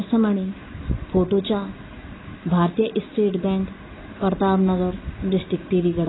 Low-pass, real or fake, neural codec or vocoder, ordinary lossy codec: 7.2 kHz; real; none; AAC, 16 kbps